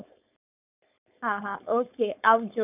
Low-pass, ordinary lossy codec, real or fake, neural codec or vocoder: 3.6 kHz; none; fake; codec, 16 kHz, 4.8 kbps, FACodec